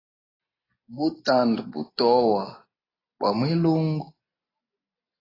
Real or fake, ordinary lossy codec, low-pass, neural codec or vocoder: real; AAC, 24 kbps; 5.4 kHz; none